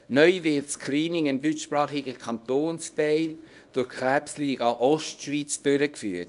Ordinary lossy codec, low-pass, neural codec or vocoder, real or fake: none; 10.8 kHz; codec, 24 kHz, 0.9 kbps, WavTokenizer, small release; fake